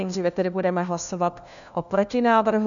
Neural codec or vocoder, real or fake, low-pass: codec, 16 kHz, 1 kbps, FunCodec, trained on LibriTTS, 50 frames a second; fake; 7.2 kHz